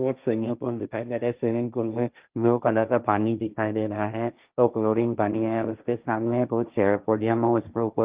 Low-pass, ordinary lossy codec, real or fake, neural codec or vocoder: 3.6 kHz; Opus, 24 kbps; fake; codec, 16 kHz, 1.1 kbps, Voila-Tokenizer